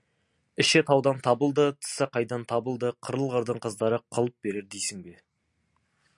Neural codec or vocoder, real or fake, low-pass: none; real; 10.8 kHz